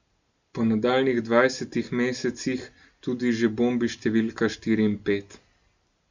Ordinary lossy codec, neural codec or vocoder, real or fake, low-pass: Opus, 64 kbps; none; real; 7.2 kHz